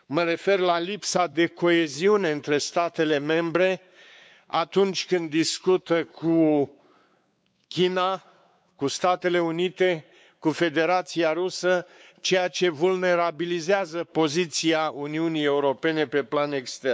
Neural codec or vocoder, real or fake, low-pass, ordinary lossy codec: codec, 16 kHz, 4 kbps, X-Codec, WavLM features, trained on Multilingual LibriSpeech; fake; none; none